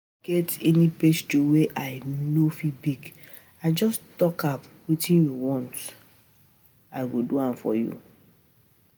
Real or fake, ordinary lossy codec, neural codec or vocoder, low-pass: real; none; none; none